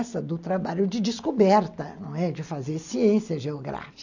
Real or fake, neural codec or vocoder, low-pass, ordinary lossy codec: real; none; 7.2 kHz; none